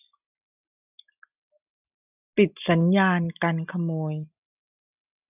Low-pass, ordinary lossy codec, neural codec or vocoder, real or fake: 3.6 kHz; none; none; real